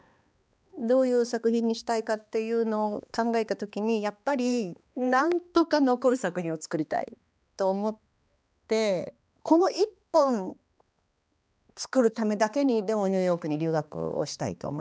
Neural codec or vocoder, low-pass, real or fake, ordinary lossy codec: codec, 16 kHz, 2 kbps, X-Codec, HuBERT features, trained on balanced general audio; none; fake; none